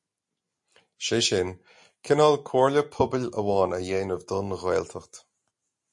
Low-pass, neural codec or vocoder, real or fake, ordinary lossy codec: 10.8 kHz; none; real; MP3, 48 kbps